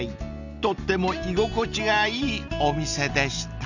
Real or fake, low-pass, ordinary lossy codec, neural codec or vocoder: real; 7.2 kHz; none; none